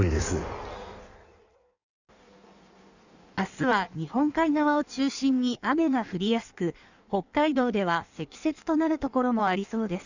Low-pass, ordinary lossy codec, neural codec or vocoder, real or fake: 7.2 kHz; none; codec, 16 kHz in and 24 kHz out, 1.1 kbps, FireRedTTS-2 codec; fake